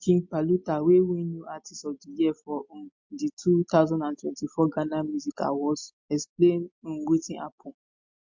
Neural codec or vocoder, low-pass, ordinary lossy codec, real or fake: none; 7.2 kHz; MP3, 48 kbps; real